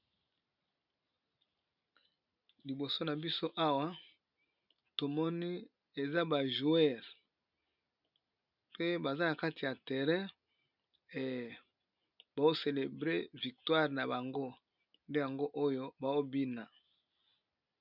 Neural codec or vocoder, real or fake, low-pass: none; real; 5.4 kHz